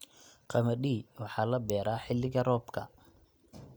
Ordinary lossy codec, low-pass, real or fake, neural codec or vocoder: none; none; real; none